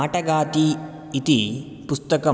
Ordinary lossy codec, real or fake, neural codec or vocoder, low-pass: none; real; none; none